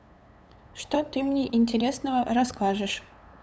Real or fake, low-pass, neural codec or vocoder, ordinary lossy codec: fake; none; codec, 16 kHz, 8 kbps, FunCodec, trained on LibriTTS, 25 frames a second; none